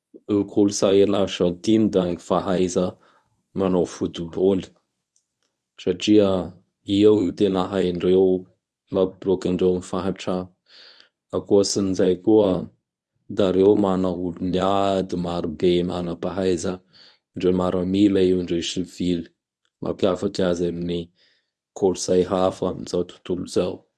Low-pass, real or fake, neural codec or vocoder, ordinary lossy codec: none; fake; codec, 24 kHz, 0.9 kbps, WavTokenizer, medium speech release version 1; none